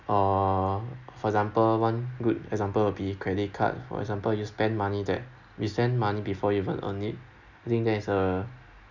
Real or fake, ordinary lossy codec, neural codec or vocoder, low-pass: real; none; none; 7.2 kHz